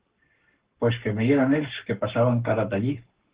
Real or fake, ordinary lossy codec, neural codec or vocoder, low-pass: fake; Opus, 16 kbps; codec, 44.1 kHz, 7.8 kbps, Pupu-Codec; 3.6 kHz